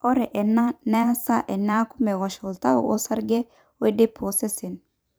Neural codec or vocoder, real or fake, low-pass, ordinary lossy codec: vocoder, 44.1 kHz, 128 mel bands every 512 samples, BigVGAN v2; fake; none; none